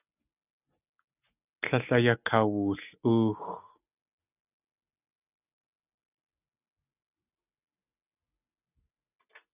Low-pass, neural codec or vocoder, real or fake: 3.6 kHz; none; real